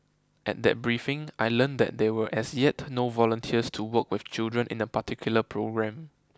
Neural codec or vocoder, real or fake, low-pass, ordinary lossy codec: none; real; none; none